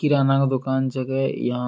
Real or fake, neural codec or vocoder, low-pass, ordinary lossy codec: real; none; none; none